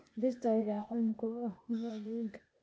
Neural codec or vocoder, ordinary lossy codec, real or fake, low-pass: codec, 16 kHz, 0.8 kbps, ZipCodec; none; fake; none